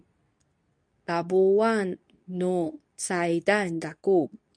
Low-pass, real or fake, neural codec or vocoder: 9.9 kHz; fake; codec, 24 kHz, 0.9 kbps, WavTokenizer, medium speech release version 2